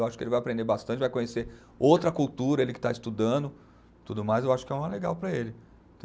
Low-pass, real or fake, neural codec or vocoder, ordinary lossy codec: none; real; none; none